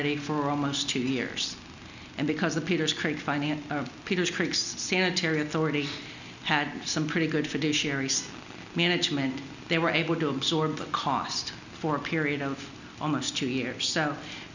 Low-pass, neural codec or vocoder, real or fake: 7.2 kHz; none; real